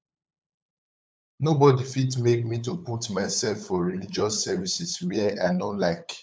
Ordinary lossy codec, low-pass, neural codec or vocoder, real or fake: none; none; codec, 16 kHz, 8 kbps, FunCodec, trained on LibriTTS, 25 frames a second; fake